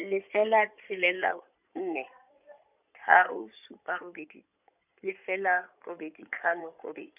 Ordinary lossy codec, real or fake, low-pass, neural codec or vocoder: none; fake; 3.6 kHz; codec, 16 kHz in and 24 kHz out, 2.2 kbps, FireRedTTS-2 codec